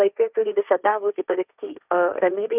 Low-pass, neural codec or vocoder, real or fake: 3.6 kHz; codec, 16 kHz, 1.1 kbps, Voila-Tokenizer; fake